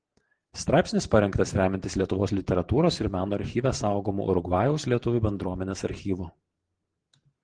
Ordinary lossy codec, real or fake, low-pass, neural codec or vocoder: Opus, 16 kbps; fake; 9.9 kHz; vocoder, 44.1 kHz, 128 mel bands every 512 samples, BigVGAN v2